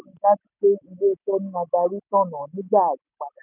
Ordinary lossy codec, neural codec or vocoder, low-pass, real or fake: none; none; 3.6 kHz; real